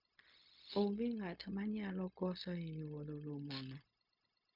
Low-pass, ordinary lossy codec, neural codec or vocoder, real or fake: 5.4 kHz; MP3, 48 kbps; codec, 16 kHz, 0.4 kbps, LongCat-Audio-Codec; fake